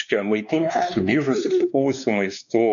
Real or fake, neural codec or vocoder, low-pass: fake; codec, 16 kHz, 2 kbps, X-Codec, WavLM features, trained on Multilingual LibriSpeech; 7.2 kHz